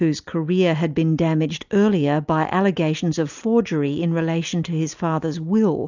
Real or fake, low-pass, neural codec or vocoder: real; 7.2 kHz; none